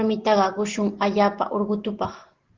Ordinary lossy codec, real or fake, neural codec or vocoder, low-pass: Opus, 16 kbps; real; none; 7.2 kHz